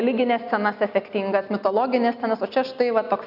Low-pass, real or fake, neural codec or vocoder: 5.4 kHz; real; none